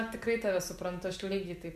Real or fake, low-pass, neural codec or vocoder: real; 14.4 kHz; none